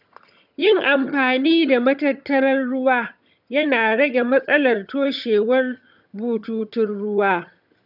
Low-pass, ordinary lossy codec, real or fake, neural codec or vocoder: 5.4 kHz; none; fake; vocoder, 22.05 kHz, 80 mel bands, HiFi-GAN